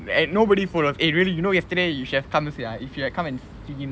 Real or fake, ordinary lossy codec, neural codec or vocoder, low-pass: real; none; none; none